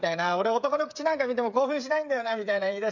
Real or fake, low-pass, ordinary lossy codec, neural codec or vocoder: fake; 7.2 kHz; none; codec, 16 kHz, 8 kbps, FreqCodec, smaller model